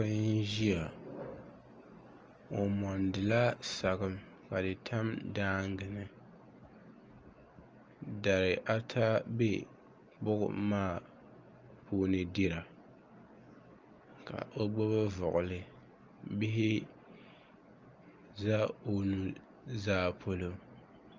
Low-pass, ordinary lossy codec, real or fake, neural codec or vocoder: 7.2 kHz; Opus, 24 kbps; real; none